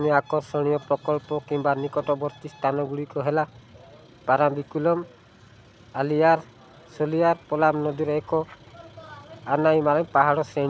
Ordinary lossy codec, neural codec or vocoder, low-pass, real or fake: none; none; none; real